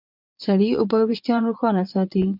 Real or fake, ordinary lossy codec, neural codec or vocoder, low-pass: fake; MP3, 48 kbps; vocoder, 22.05 kHz, 80 mel bands, Vocos; 5.4 kHz